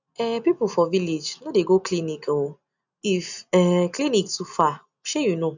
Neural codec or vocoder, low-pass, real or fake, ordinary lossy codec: none; 7.2 kHz; real; none